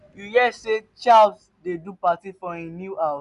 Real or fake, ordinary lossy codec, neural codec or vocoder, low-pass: real; none; none; 10.8 kHz